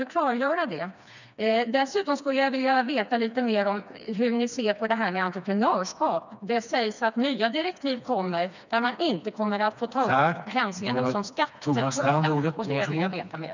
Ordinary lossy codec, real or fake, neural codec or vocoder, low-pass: none; fake; codec, 16 kHz, 2 kbps, FreqCodec, smaller model; 7.2 kHz